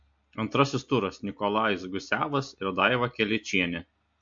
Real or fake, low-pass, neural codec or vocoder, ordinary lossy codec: real; 7.2 kHz; none; MP3, 48 kbps